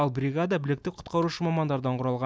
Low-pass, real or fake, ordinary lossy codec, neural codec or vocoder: none; real; none; none